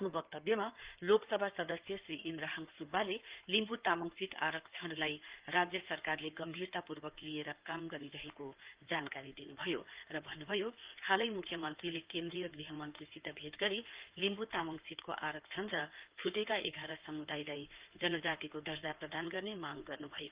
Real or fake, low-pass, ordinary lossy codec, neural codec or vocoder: fake; 3.6 kHz; Opus, 32 kbps; codec, 16 kHz in and 24 kHz out, 2.2 kbps, FireRedTTS-2 codec